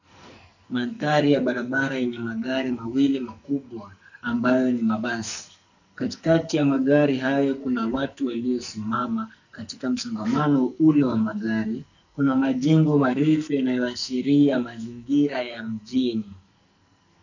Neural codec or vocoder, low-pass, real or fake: codec, 44.1 kHz, 2.6 kbps, SNAC; 7.2 kHz; fake